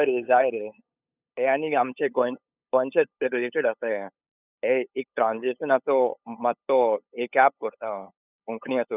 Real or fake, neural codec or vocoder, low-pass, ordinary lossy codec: fake; codec, 16 kHz, 8 kbps, FunCodec, trained on LibriTTS, 25 frames a second; 3.6 kHz; none